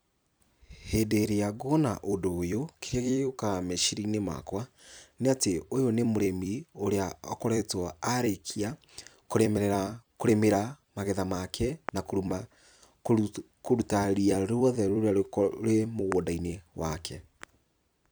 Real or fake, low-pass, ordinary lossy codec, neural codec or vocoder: fake; none; none; vocoder, 44.1 kHz, 128 mel bands every 256 samples, BigVGAN v2